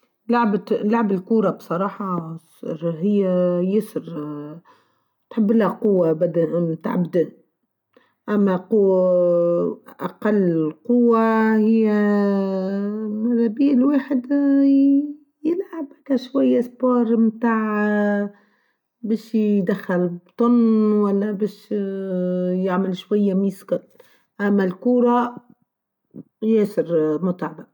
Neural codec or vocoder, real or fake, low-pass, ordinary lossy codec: none; real; 19.8 kHz; none